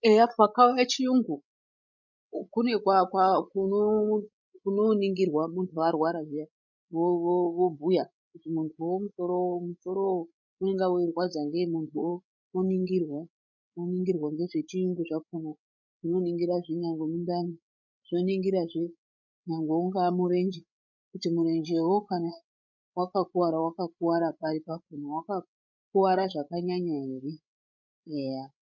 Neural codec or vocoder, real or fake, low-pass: codec, 16 kHz, 16 kbps, FreqCodec, larger model; fake; 7.2 kHz